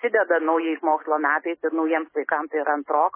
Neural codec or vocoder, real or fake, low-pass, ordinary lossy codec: none; real; 3.6 kHz; MP3, 16 kbps